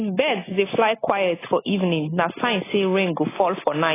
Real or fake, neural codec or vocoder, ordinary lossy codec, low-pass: real; none; AAC, 16 kbps; 3.6 kHz